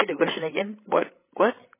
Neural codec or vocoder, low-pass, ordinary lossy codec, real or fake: codec, 16 kHz, 16 kbps, FreqCodec, larger model; 3.6 kHz; MP3, 16 kbps; fake